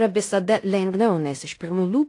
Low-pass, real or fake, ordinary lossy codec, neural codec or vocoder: 10.8 kHz; fake; AAC, 48 kbps; codec, 16 kHz in and 24 kHz out, 0.9 kbps, LongCat-Audio-Codec, fine tuned four codebook decoder